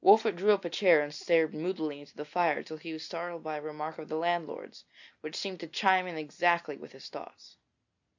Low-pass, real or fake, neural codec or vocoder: 7.2 kHz; real; none